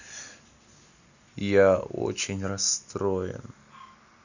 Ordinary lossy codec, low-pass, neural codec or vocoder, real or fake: none; 7.2 kHz; none; real